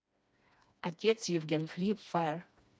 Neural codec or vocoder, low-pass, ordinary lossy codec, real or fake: codec, 16 kHz, 2 kbps, FreqCodec, smaller model; none; none; fake